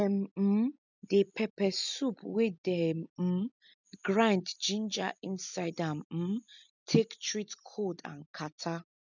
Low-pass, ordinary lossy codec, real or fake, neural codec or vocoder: 7.2 kHz; none; real; none